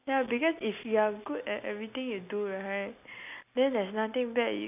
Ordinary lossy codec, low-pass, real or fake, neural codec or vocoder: none; 3.6 kHz; real; none